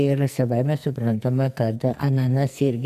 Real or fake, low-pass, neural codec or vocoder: fake; 14.4 kHz; codec, 44.1 kHz, 2.6 kbps, SNAC